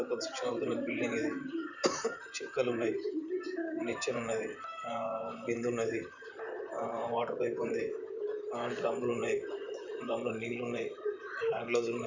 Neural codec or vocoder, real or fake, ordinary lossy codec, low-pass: vocoder, 44.1 kHz, 128 mel bands, Pupu-Vocoder; fake; none; 7.2 kHz